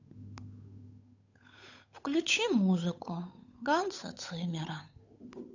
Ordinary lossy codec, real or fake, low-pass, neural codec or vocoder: none; fake; 7.2 kHz; codec, 16 kHz, 8 kbps, FunCodec, trained on Chinese and English, 25 frames a second